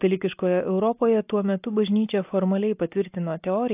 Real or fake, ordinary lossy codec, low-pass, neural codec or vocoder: real; AAC, 32 kbps; 3.6 kHz; none